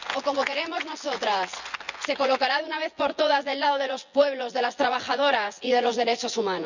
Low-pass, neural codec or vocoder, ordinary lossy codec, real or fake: 7.2 kHz; vocoder, 24 kHz, 100 mel bands, Vocos; none; fake